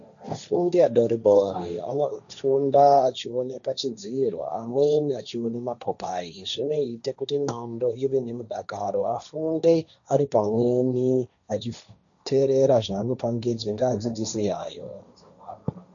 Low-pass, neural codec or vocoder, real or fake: 7.2 kHz; codec, 16 kHz, 1.1 kbps, Voila-Tokenizer; fake